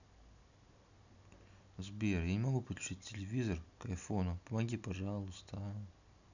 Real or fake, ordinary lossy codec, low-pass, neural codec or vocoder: real; none; 7.2 kHz; none